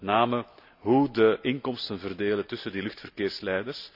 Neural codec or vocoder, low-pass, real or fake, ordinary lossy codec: none; 5.4 kHz; real; none